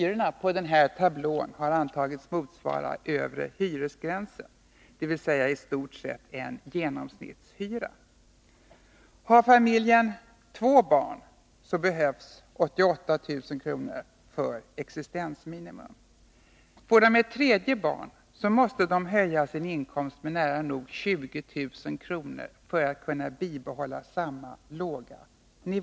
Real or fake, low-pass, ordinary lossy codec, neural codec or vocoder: real; none; none; none